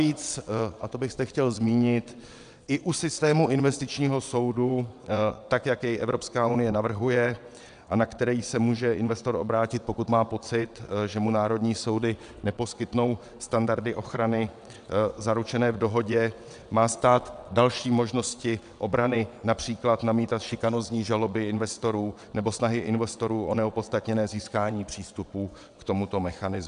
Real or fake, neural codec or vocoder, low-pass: fake; vocoder, 22.05 kHz, 80 mel bands, WaveNeXt; 9.9 kHz